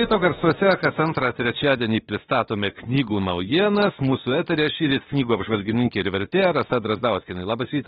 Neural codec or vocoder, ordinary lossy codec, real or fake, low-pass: autoencoder, 48 kHz, 32 numbers a frame, DAC-VAE, trained on Japanese speech; AAC, 16 kbps; fake; 19.8 kHz